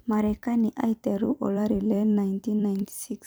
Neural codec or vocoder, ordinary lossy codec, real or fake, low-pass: vocoder, 44.1 kHz, 128 mel bands every 256 samples, BigVGAN v2; none; fake; none